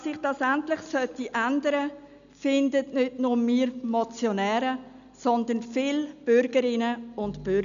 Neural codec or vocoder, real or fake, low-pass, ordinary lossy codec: none; real; 7.2 kHz; none